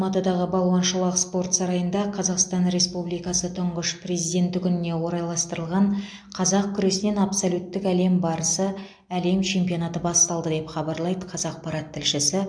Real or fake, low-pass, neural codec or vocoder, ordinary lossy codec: real; 9.9 kHz; none; MP3, 64 kbps